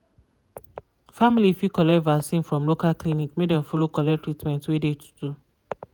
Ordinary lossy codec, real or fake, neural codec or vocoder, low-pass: none; fake; vocoder, 48 kHz, 128 mel bands, Vocos; none